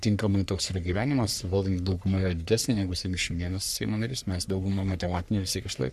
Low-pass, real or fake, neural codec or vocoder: 14.4 kHz; fake; codec, 44.1 kHz, 3.4 kbps, Pupu-Codec